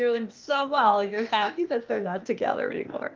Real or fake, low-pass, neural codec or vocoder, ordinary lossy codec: fake; 7.2 kHz; codec, 16 kHz, 0.8 kbps, ZipCodec; Opus, 24 kbps